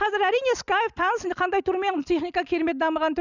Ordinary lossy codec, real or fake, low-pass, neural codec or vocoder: none; real; 7.2 kHz; none